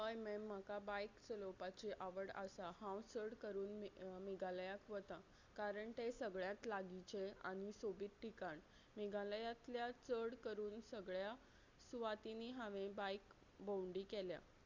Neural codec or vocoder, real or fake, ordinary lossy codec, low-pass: none; real; Opus, 64 kbps; 7.2 kHz